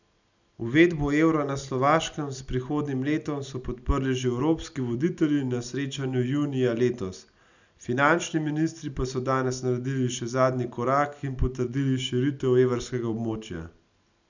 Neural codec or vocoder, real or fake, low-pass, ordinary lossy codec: none; real; 7.2 kHz; none